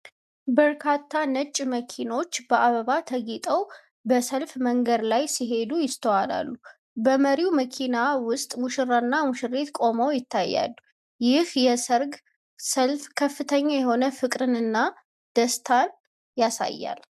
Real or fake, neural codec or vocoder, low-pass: real; none; 14.4 kHz